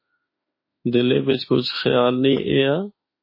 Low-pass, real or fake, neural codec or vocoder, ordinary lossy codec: 5.4 kHz; fake; autoencoder, 48 kHz, 32 numbers a frame, DAC-VAE, trained on Japanese speech; MP3, 24 kbps